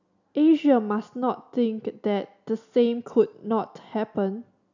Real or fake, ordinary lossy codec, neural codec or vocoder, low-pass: real; none; none; 7.2 kHz